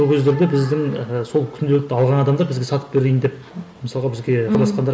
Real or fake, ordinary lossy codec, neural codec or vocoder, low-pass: real; none; none; none